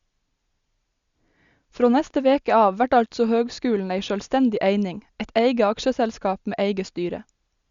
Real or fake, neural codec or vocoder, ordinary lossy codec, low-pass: real; none; Opus, 64 kbps; 7.2 kHz